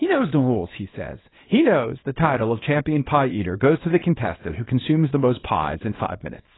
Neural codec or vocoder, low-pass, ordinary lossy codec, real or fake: codec, 24 kHz, 0.9 kbps, WavTokenizer, small release; 7.2 kHz; AAC, 16 kbps; fake